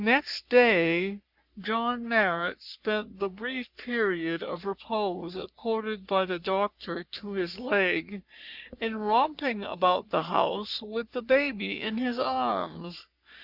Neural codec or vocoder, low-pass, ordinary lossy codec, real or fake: codec, 44.1 kHz, 3.4 kbps, Pupu-Codec; 5.4 kHz; Opus, 64 kbps; fake